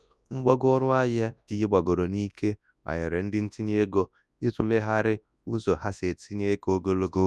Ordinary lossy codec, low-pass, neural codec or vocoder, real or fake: none; none; codec, 24 kHz, 0.9 kbps, WavTokenizer, large speech release; fake